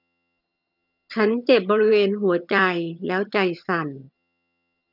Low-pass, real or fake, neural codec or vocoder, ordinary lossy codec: 5.4 kHz; fake; vocoder, 22.05 kHz, 80 mel bands, HiFi-GAN; none